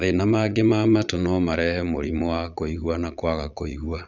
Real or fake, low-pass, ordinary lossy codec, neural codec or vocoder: fake; 7.2 kHz; none; vocoder, 22.05 kHz, 80 mel bands, Vocos